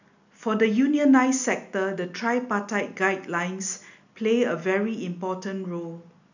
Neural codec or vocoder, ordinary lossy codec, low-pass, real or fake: none; none; 7.2 kHz; real